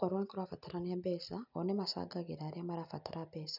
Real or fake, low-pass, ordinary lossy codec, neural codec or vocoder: real; 5.4 kHz; none; none